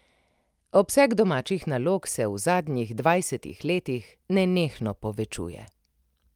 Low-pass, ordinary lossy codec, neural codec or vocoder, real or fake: 19.8 kHz; Opus, 32 kbps; none; real